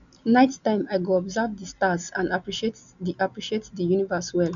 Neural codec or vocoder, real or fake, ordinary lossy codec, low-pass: none; real; none; 7.2 kHz